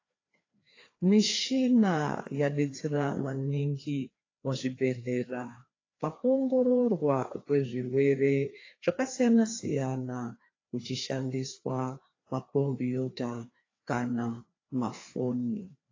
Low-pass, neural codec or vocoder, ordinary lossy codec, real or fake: 7.2 kHz; codec, 16 kHz, 2 kbps, FreqCodec, larger model; AAC, 32 kbps; fake